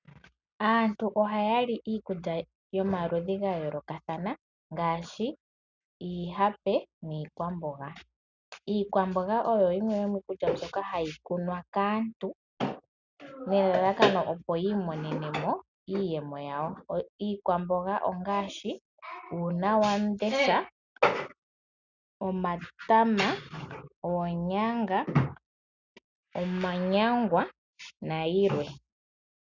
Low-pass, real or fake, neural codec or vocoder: 7.2 kHz; real; none